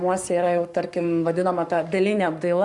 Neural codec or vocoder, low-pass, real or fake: codec, 44.1 kHz, 7.8 kbps, Pupu-Codec; 10.8 kHz; fake